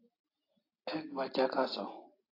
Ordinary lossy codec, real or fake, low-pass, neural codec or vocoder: AAC, 24 kbps; real; 5.4 kHz; none